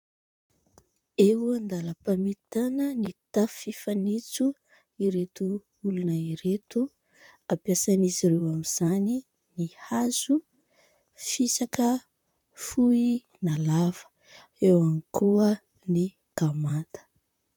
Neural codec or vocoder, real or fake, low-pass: vocoder, 44.1 kHz, 128 mel bands, Pupu-Vocoder; fake; 19.8 kHz